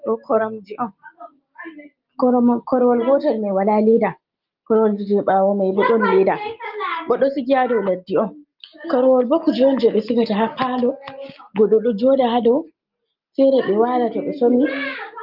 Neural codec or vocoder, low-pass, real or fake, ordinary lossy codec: none; 5.4 kHz; real; Opus, 32 kbps